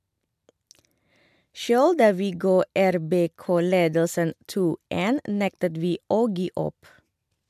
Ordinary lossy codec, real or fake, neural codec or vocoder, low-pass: MP3, 96 kbps; real; none; 14.4 kHz